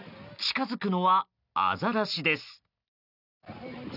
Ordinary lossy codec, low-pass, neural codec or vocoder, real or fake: none; 5.4 kHz; none; real